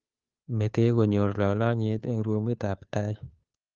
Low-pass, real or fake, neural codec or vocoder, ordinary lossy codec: 7.2 kHz; fake; codec, 16 kHz, 2 kbps, FunCodec, trained on Chinese and English, 25 frames a second; Opus, 32 kbps